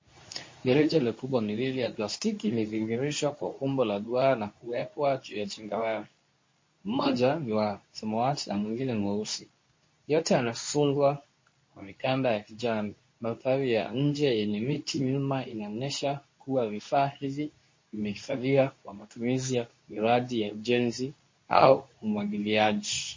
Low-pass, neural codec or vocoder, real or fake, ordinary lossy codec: 7.2 kHz; codec, 24 kHz, 0.9 kbps, WavTokenizer, medium speech release version 2; fake; MP3, 32 kbps